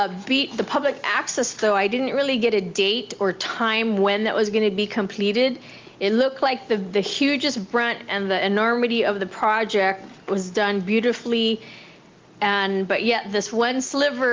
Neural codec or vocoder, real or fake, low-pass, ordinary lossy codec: none; real; 7.2 kHz; Opus, 32 kbps